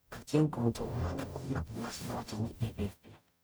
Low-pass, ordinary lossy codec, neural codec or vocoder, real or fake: none; none; codec, 44.1 kHz, 0.9 kbps, DAC; fake